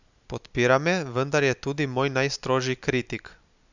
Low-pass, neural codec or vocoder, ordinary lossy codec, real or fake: 7.2 kHz; none; none; real